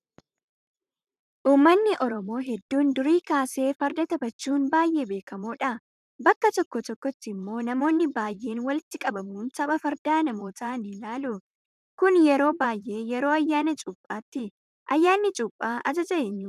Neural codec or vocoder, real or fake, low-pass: vocoder, 44.1 kHz, 128 mel bands, Pupu-Vocoder; fake; 14.4 kHz